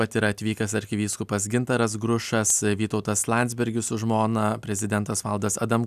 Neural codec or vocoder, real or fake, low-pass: none; real; 14.4 kHz